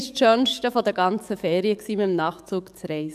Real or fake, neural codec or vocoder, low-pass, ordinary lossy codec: fake; codec, 44.1 kHz, 7.8 kbps, DAC; 14.4 kHz; none